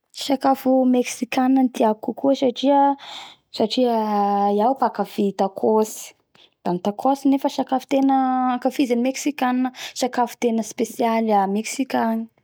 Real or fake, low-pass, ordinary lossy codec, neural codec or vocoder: real; none; none; none